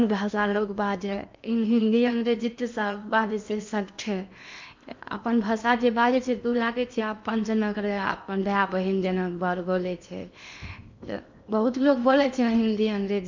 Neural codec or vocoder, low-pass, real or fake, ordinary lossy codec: codec, 16 kHz in and 24 kHz out, 0.8 kbps, FocalCodec, streaming, 65536 codes; 7.2 kHz; fake; MP3, 64 kbps